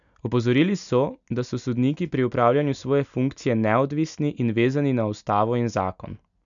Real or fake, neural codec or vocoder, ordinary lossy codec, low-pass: real; none; none; 7.2 kHz